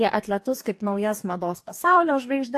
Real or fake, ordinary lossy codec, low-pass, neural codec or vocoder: fake; AAC, 64 kbps; 14.4 kHz; codec, 44.1 kHz, 2.6 kbps, DAC